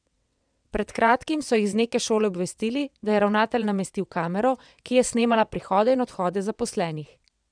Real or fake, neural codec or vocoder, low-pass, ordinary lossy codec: fake; vocoder, 22.05 kHz, 80 mel bands, WaveNeXt; 9.9 kHz; none